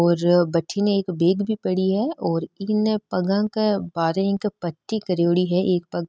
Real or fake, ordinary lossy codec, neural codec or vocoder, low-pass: real; none; none; none